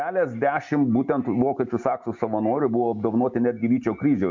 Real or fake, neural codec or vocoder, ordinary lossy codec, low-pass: real; none; MP3, 48 kbps; 7.2 kHz